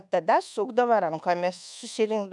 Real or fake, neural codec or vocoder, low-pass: fake; codec, 24 kHz, 1.2 kbps, DualCodec; 10.8 kHz